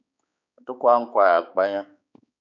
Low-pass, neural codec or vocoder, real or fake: 7.2 kHz; codec, 16 kHz, 4 kbps, X-Codec, HuBERT features, trained on balanced general audio; fake